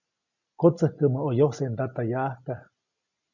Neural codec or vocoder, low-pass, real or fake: none; 7.2 kHz; real